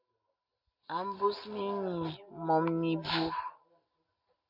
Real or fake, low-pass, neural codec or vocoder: real; 5.4 kHz; none